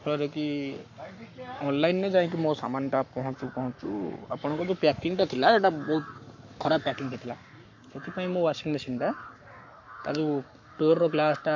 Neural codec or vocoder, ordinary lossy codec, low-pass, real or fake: codec, 44.1 kHz, 7.8 kbps, Pupu-Codec; MP3, 48 kbps; 7.2 kHz; fake